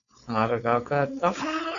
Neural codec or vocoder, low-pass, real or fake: codec, 16 kHz, 4.8 kbps, FACodec; 7.2 kHz; fake